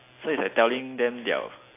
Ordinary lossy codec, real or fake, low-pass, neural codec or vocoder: AAC, 24 kbps; real; 3.6 kHz; none